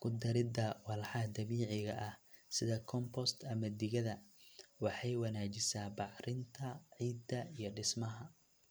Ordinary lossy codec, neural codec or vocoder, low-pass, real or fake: none; none; none; real